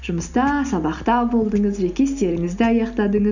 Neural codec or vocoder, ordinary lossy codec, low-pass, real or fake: none; none; 7.2 kHz; real